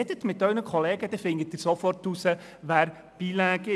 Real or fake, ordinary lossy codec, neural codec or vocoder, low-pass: real; none; none; none